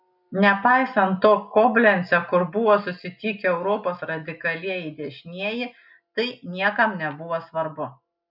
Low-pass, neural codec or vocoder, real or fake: 5.4 kHz; none; real